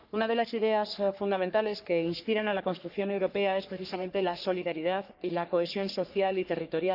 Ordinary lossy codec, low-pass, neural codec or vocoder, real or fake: none; 5.4 kHz; codec, 44.1 kHz, 3.4 kbps, Pupu-Codec; fake